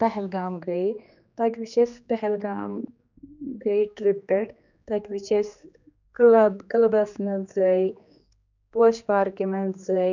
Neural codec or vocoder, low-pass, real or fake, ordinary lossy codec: codec, 16 kHz, 2 kbps, X-Codec, HuBERT features, trained on general audio; 7.2 kHz; fake; none